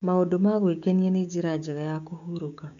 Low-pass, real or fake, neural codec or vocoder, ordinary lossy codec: 7.2 kHz; fake; codec, 16 kHz, 6 kbps, DAC; none